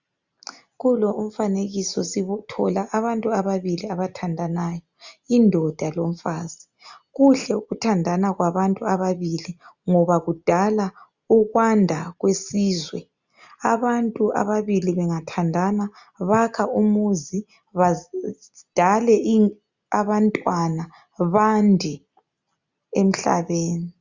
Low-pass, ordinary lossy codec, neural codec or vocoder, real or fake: 7.2 kHz; Opus, 64 kbps; none; real